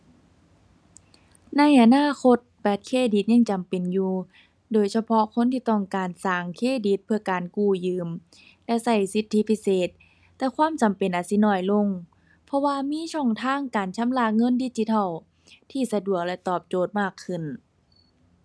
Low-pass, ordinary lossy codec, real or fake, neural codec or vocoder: none; none; real; none